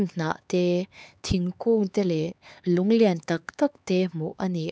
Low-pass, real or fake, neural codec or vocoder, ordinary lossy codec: none; fake; codec, 16 kHz, 4 kbps, X-Codec, HuBERT features, trained on LibriSpeech; none